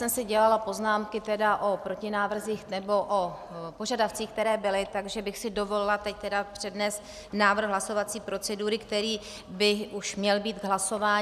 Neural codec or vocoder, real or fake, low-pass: none; real; 14.4 kHz